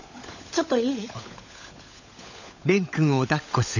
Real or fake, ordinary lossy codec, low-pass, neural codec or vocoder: fake; none; 7.2 kHz; codec, 16 kHz, 16 kbps, FunCodec, trained on LibriTTS, 50 frames a second